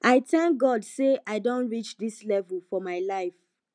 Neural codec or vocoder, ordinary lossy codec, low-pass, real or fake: none; none; 9.9 kHz; real